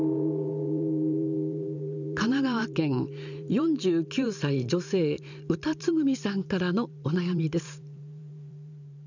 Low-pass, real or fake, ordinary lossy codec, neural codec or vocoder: 7.2 kHz; fake; none; vocoder, 44.1 kHz, 128 mel bands every 512 samples, BigVGAN v2